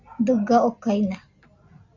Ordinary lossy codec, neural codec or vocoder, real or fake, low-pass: Opus, 64 kbps; none; real; 7.2 kHz